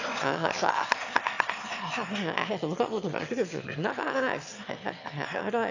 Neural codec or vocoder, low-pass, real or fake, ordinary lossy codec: autoencoder, 22.05 kHz, a latent of 192 numbers a frame, VITS, trained on one speaker; 7.2 kHz; fake; AAC, 48 kbps